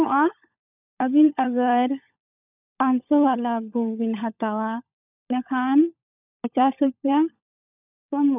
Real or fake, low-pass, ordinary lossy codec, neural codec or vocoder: fake; 3.6 kHz; none; codec, 16 kHz, 16 kbps, FunCodec, trained on LibriTTS, 50 frames a second